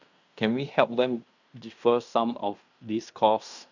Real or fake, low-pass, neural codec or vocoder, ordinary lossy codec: fake; 7.2 kHz; codec, 16 kHz in and 24 kHz out, 0.9 kbps, LongCat-Audio-Codec, fine tuned four codebook decoder; none